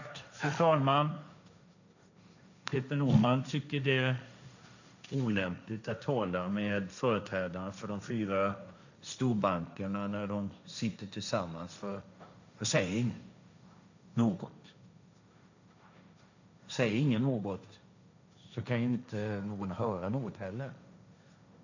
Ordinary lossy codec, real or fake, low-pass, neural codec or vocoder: none; fake; 7.2 kHz; codec, 16 kHz, 1.1 kbps, Voila-Tokenizer